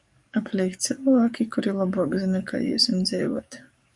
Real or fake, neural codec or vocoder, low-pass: fake; codec, 44.1 kHz, 7.8 kbps, DAC; 10.8 kHz